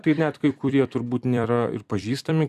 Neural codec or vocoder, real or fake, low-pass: vocoder, 44.1 kHz, 128 mel bands every 256 samples, BigVGAN v2; fake; 14.4 kHz